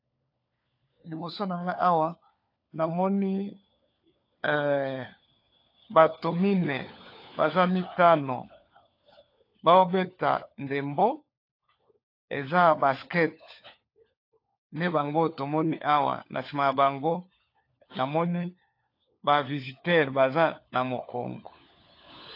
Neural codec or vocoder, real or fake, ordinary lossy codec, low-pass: codec, 16 kHz, 4 kbps, FunCodec, trained on LibriTTS, 50 frames a second; fake; AAC, 32 kbps; 5.4 kHz